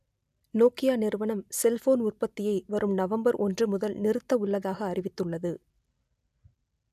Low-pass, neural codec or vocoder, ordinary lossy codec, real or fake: 14.4 kHz; none; none; real